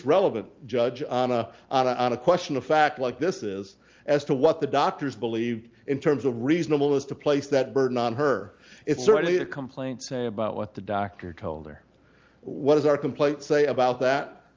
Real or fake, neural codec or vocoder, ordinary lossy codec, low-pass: real; none; Opus, 32 kbps; 7.2 kHz